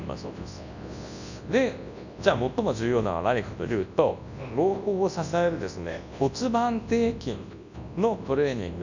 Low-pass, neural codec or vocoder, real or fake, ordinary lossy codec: 7.2 kHz; codec, 24 kHz, 0.9 kbps, WavTokenizer, large speech release; fake; none